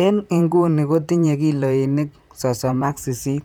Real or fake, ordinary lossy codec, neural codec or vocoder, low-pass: fake; none; vocoder, 44.1 kHz, 128 mel bands, Pupu-Vocoder; none